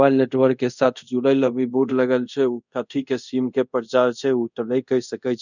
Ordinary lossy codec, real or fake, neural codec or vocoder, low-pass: none; fake; codec, 24 kHz, 0.5 kbps, DualCodec; 7.2 kHz